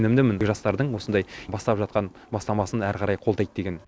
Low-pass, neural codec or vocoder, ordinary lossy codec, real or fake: none; none; none; real